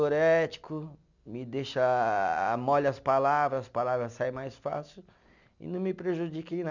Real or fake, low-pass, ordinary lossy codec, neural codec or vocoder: real; 7.2 kHz; none; none